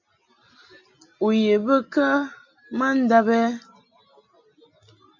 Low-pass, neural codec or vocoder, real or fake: 7.2 kHz; none; real